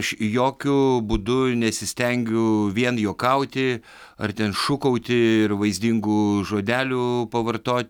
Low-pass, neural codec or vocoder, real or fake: 19.8 kHz; none; real